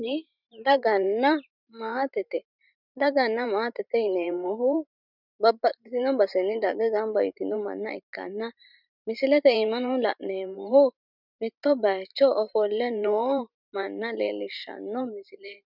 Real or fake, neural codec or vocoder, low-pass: fake; vocoder, 44.1 kHz, 128 mel bands every 512 samples, BigVGAN v2; 5.4 kHz